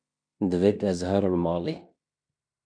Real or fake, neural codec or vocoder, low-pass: fake; codec, 16 kHz in and 24 kHz out, 0.9 kbps, LongCat-Audio-Codec, fine tuned four codebook decoder; 9.9 kHz